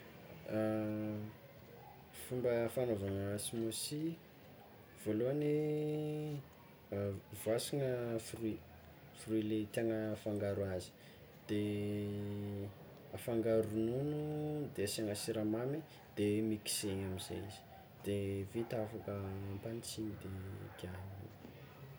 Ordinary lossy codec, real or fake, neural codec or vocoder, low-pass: none; real; none; none